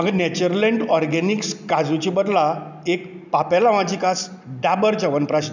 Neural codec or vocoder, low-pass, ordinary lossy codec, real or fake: none; 7.2 kHz; none; real